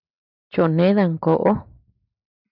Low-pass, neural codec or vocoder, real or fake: 5.4 kHz; none; real